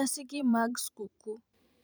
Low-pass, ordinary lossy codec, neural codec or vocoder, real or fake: none; none; vocoder, 44.1 kHz, 128 mel bands every 512 samples, BigVGAN v2; fake